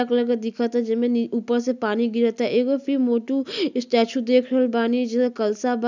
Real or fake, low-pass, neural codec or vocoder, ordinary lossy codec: real; 7.2 kHz; none; none